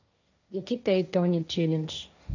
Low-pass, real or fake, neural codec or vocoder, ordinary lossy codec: 7.2 kHz; fake; codec, 16 kHz, 1.1 kbps, Voila-Tokenizer; none